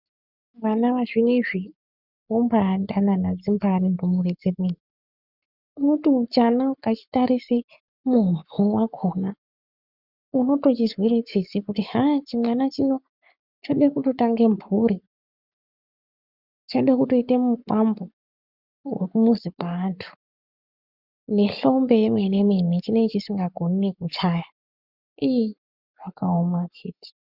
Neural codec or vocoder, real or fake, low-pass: codec, 44.1 kHz, 7.8 kbps, Pupu-Codec; fake; 5.4 kHz